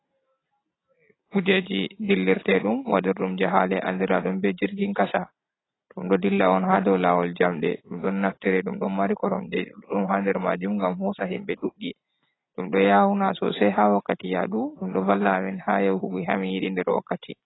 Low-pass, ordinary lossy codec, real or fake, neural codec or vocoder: 7.2 kHz; AAC, 16 kbps; real; none